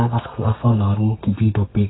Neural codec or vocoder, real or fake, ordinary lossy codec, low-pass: codec, 32 kHz, 1.9 kbps, SNAC; fake; AAC, 16 kbps; 7.2 kHz